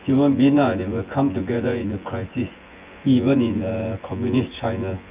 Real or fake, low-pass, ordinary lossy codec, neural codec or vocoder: fake; 3.6 kHz; Opus, 24 kbps; vocoder, 24 kHz, 100 mel bands, Vocos